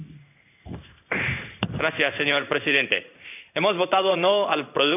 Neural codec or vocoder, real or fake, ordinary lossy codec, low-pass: codec, 16 kHz in and 24 kHz out, 1 kbps, XY-Tokenizer; fake; none; 3.6 kHz